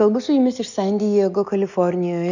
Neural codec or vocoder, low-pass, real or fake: none; 7.2 kHz; real